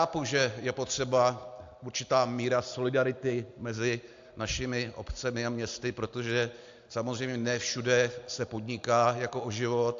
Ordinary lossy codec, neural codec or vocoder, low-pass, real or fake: AAC, 64 kbps; none; 7.2 kHz; real